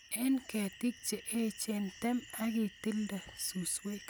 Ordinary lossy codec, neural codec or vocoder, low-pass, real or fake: none; none; none; real